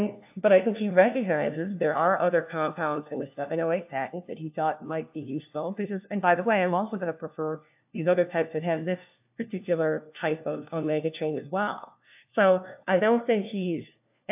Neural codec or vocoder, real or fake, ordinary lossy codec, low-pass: codec, 16 kHz, 1 kbps, FunCodec, trained on LibriTTS, 50 frames a second; fake; AAC, 32 kbps; 3.6 kHz